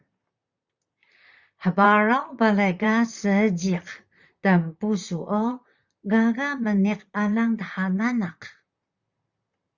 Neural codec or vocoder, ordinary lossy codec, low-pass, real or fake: vocoder, 44.1 kHz, 128 mel bands, Pupu-Vocoder; Opus, 64 kbps; 7.2 kHz; fake